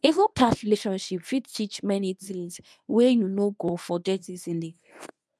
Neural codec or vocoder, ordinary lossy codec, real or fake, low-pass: codec, 24 kHz, 0.9 kbps, WavTokenizer, medium speech release version 1; none; fake; none